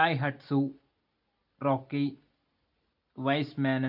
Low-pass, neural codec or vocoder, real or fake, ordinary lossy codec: 5.4 kHz; none; real; AAC, 48 kbps